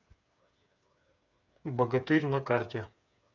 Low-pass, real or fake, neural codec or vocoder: 7.2 kHz; fake; codec, 16 kHz, 8 kbps, FreqCodec, smaller model